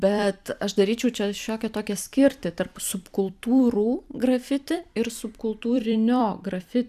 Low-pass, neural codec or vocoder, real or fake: 14.4 kHz; vocoder, 44.1 kHz, 128 mel bands every 256 samples, BigVGAN v2; fake